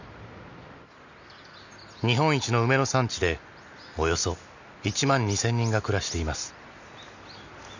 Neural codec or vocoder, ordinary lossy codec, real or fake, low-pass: none; none; real; 7.2 kHz